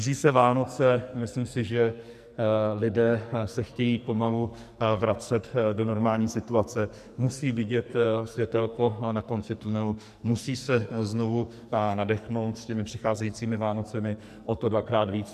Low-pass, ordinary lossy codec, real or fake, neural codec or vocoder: 14.4 kHz; MP3, 96 kbps; fake; codec, 44.1 kHz, 2.6 kbps, SNAC